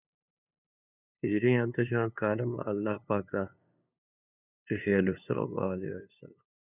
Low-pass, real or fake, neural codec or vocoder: 3.6 kHz; fake; codec, 16 kHz, 2 kbps, FunCodec, trained on LibriTTS, 25 frames a second